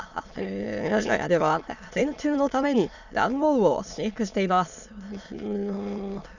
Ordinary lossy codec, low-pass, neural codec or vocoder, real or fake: none; 7.2 kHz; autoencoder, 22.05 kHz, a latent of 192 numbers a frame, VITS, trained on many speakers; fake